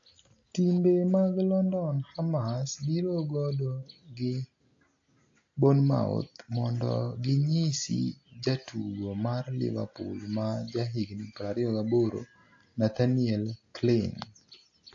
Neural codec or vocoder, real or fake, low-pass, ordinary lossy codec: none; real; 7.2 kHz; AAC, 48 kbps